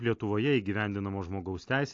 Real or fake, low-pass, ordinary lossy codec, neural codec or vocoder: real; 7.2 kHz; AAC, 48 kbps; none